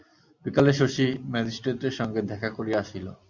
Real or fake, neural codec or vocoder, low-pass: real; none; 7.2 kHz